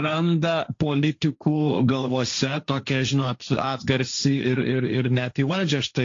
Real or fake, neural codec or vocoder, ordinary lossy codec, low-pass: fake; codec, 16 kHz, 1.1 kbps, Voila-Tokenizer; AAC, 48 kbps; 7.2 kHz